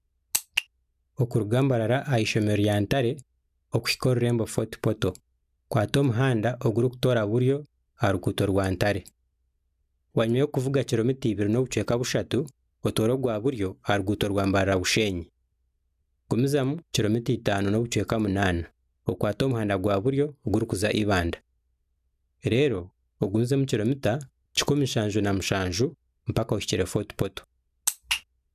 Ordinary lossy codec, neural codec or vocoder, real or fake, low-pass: none; none; real; 14.4 kHz